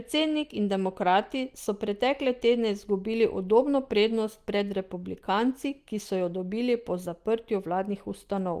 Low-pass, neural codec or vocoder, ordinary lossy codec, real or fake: 14.4 kHz; none; Opus, 24 kbps; real